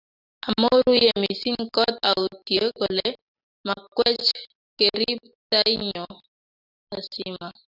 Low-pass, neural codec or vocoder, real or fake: 5.4 kHz; none; real